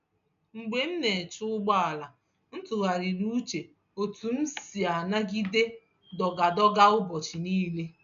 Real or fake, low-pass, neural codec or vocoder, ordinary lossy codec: real; 7.2 kHz; none; none